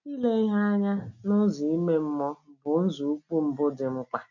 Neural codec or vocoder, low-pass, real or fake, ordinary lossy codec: none; 7.2 kHz; real; none